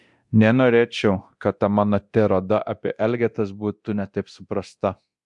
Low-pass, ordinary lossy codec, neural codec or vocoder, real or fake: 10.8 kHz; MP3, 64 kbps; codec, 24 kHz, 0.9 kbps, DualCodec; fake